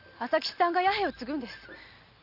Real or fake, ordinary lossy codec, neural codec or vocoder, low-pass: real; none; none; 5.4 kHz